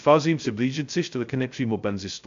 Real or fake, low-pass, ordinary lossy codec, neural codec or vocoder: fake; 7.2 kHz; AAC, 64 kbps; codec, 16 kHz, 0.2 kbps, FocalCodec